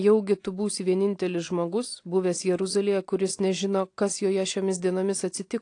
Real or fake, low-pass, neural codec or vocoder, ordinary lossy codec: real; 9.9 kHz; none; AAC, 48 kbps